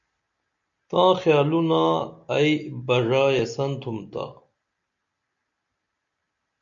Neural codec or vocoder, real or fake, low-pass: none; real; 7.2 kHz